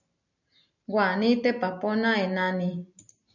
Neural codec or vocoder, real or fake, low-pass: none; real; 7.2 kHz